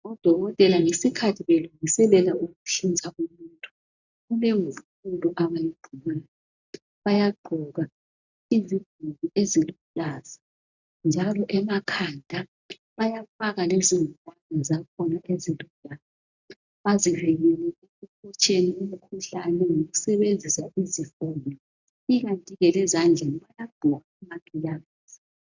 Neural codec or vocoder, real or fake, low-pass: none; real; 7.2 kHz